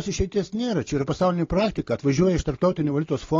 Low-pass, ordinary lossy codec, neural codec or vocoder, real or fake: 7.2 kHz; AAC, 32 kbps; none; real